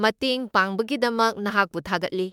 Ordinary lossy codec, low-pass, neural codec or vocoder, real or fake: MP3, 96 kbps; 19.8 kHz; autoencoder, 48 kHz, 32 numbers a frame, DAC-VAE, trained on Japanese speech; fake